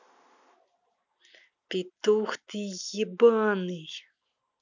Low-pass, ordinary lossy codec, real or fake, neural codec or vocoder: 7.2 kHz; none; real; none